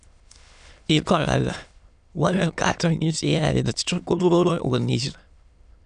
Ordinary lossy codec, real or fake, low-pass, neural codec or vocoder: none; fake; 9.9 kHz; autoencoder, 22.05 kHz, a latent of 192 numbers a frame, VITS, trained on many speakers